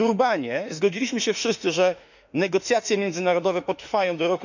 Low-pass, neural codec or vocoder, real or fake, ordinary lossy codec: 7.2 kHz; autoencoder, 48 kHz, 32 numbers a frame, DAC-VAE, trained on Japanese speech; fake; none